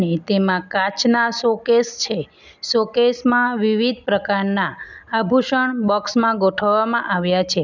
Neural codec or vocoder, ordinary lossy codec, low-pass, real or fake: none; none; 7.2 kHz; real